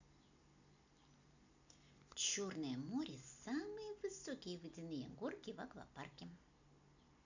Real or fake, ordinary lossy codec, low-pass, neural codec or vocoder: real; none; 7.2 kHz; none